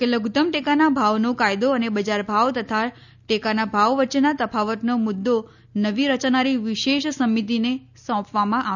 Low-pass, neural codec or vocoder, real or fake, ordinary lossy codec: 7.2 kHz; none; real; none